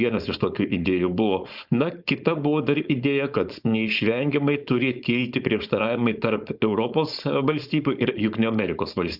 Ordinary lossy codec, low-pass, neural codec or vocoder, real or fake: Opus, 64 kbps; 5.4 kHz; codec, 16 kHz, 4.8 kbps, FACodec; fake